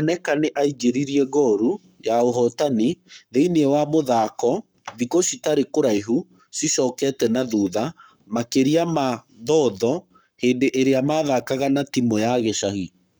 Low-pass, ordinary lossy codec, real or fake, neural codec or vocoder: none; none; fake; codec, 44.1 kHz, 7.8 kbps, Pupu-Codec